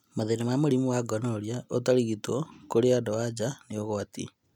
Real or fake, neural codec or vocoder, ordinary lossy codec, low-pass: real; none; none; 19.8 kHz